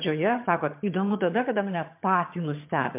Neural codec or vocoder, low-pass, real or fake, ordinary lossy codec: vocoder, 22.05 kHz, 80 mel bands, HiFi-GAN; 3.6 kHz; fake; MP3, 32 kbps